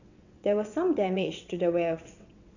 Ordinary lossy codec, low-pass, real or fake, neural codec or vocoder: none; 7.2 kHz; fake; vocoder, 22.05 kHz, 80 mel bands, WaveNeXt